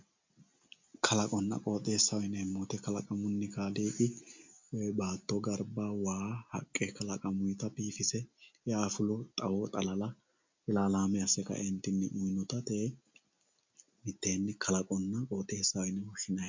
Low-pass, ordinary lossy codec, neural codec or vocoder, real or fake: 7.2 kHz; MP3, 64 kbps; none; real